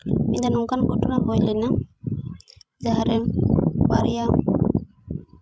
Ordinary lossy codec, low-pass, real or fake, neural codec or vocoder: none; none; fake; codec, 16 kHz, 16 kbps, FreqCodec, larger model